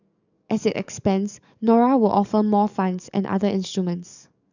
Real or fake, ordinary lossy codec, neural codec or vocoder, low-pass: fake; none; codec, 44.1 kHz, 7.8 kbps, DAC; 7.2 kHz